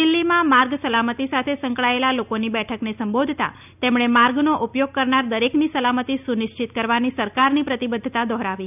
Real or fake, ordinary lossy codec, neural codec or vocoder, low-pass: real; none; none; 3.6 kHz